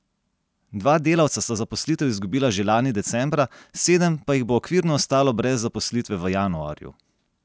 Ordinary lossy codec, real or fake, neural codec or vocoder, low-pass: none; real; none; none